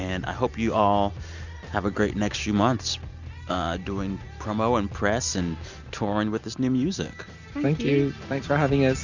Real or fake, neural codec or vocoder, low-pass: real; none; 7.2 kHz